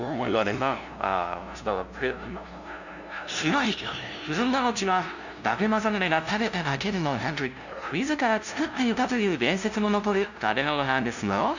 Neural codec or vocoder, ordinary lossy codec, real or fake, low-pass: codec, 16 kHz, 0.5 kbps, FunCodec, trained on LibriTTS, 25 frames a second; none; fake; 7.2 kHz